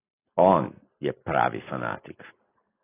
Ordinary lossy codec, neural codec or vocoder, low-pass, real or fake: AAC, 16 kbps; none; 3.6 kHz; real